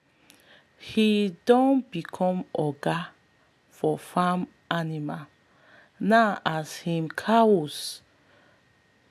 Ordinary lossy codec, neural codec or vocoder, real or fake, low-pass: AAC, 96 kbps; none; real; 14.4 kHz